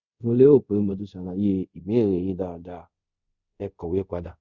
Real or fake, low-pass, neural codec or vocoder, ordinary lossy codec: fake; 7.2 kHz; codec, 24 kHz, 0.5 kbps, DualCodec; Opus, 64 kbps